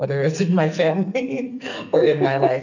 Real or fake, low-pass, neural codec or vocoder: fake; 7.2 kHz; codec, 44.1 kHz, 2.6 kbps, SNAC